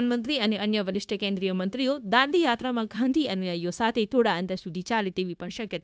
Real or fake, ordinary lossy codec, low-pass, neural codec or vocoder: fake; none; none; codec, 16 kHz, 0.9 kbps, LongCat-Audio-Codec